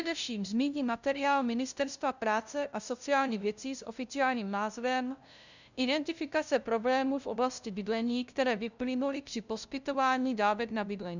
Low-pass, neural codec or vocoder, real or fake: 7.2 kHz; codec, 16 kHz, 0.5 kbps, FunCodec, trained on LibriTTS, 25 frames a second; fake